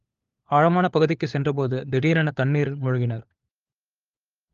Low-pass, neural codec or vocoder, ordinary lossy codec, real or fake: 7.2 kHz; codec, 16 kHz, 4 kbps, FunCodec, trained on LibriTTS, 50 frames a second; Opus, 32 kbps; fake